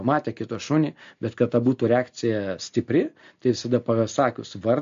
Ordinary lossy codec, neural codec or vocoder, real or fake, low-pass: MP3, 48 kbps; none; real; 7.2 kHz